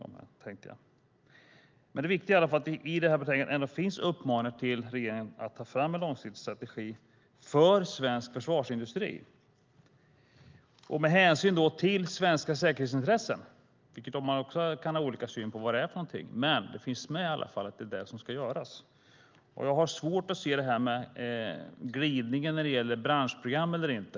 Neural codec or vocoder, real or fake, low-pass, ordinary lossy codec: none; real; 7.2 kHz; Opus, 24 kbps